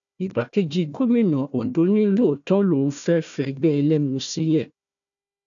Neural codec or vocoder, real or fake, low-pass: codec, 16 kHz, 1 kbps, FunCodec, trained on Chinese and English, 50 frames a second; fake; 7.2 kHz